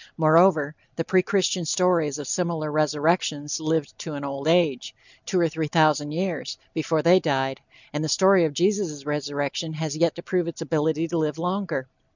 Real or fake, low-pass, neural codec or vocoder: real; 7.2 kHz; none